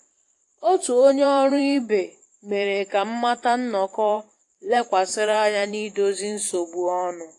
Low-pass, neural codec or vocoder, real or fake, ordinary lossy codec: 10.8 kHz; vocoder, 44.1 kHz, 128 mel bands every 512 samples, BigVGAN v2; fake; AAC, 48 kbps